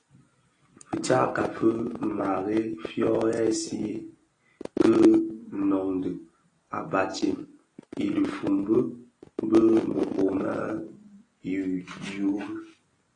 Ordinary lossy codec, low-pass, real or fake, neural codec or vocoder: AAC, 32 kbps; 9.9 kHz; real; none